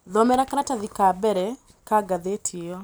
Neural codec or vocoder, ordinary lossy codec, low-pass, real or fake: none; none; none; real